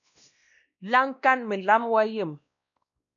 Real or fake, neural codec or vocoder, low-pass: fake; codec, 16 kHz, 1 kbps, X-Codec, WavLM features, trained on Multilingual LibriSpeech; 7.2 kHz